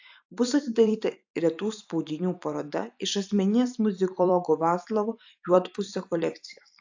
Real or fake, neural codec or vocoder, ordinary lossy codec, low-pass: fake; vocoder, 44.1 kHz, 128 mel bands every 256 samples, BigVGAN v2; AAC, 48 kbps; 7.2 kHz